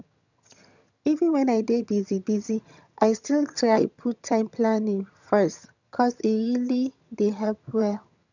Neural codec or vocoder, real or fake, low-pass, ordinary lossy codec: vocoder, 22.05 kHz, 80 mel bands, HiFi-GAN; fake; 7.2 kHz; none